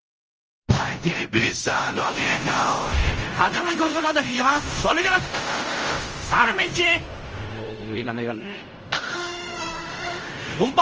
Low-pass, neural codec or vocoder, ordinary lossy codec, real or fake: 7.2 kHz; codec, 16 kHz in and 24 kHz out, 0.4 kbps, LongCat-Audio-Codec, fine tuned four codebook decoder; Opus, 24 kbps; fake